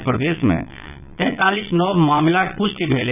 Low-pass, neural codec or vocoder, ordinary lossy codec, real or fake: 3.6 kHz; vocoder, 22.05 kHz, 80 mel bands, Vocos; none; fake